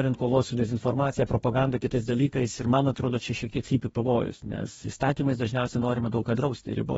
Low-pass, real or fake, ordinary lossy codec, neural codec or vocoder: 19.8 kHz; fake; AAC, 24 kbps; codec, 44.1 kHz, 2.6 kbps, DAC